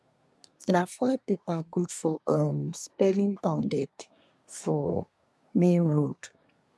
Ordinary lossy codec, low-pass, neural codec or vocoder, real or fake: none; none; codec, 24 kHz, 1 kbps, SNAC; fake